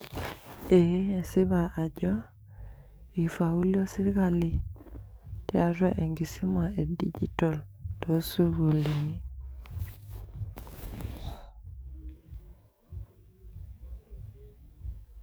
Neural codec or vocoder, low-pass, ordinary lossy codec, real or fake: codec, 44.1 kHz, 7.8 kbps, DAC; none; none; fake